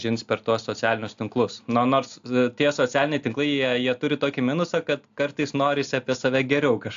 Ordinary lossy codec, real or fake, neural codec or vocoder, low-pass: AAC, 64 kbps; real; none; 7.2 kHz